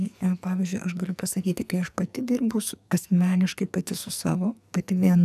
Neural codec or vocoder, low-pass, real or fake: codec, 44.1 kHz, 2.6 kbps, SNAC; 14.4 kHz; fake